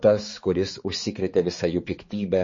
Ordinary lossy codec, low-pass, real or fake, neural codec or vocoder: MP3, 32 kbps; 7.2 kHz; fake; codec, 16 kHz, 4 kbps, X-Codec, HuBERT features, trained on balanced general audio